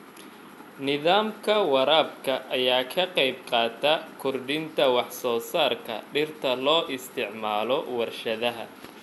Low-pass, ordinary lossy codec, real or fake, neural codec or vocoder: 14.4 kHz; MP3, 96 kbps; real; none